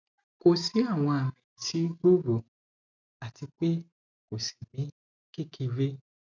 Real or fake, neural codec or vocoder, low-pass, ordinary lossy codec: real; none; 7.2 kHz; none